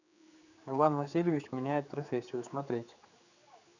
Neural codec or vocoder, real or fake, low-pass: codec, 16 kHz, 4 kbps, X-Codec, HuBERT features, trained on general audio; fake; 7.2 kHz